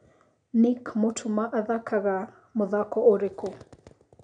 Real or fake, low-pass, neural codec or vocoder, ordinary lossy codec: real; 9.9 kHz; none; none